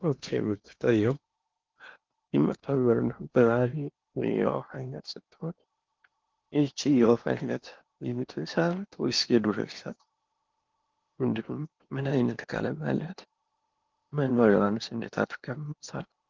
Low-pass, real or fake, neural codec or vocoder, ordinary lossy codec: 7.2 kHz; fake; codec, 16 kHz in and 24 kHz out, 0.8 kbps, FocalCodec, streaming, 65536 codes; Opus, 24 kbps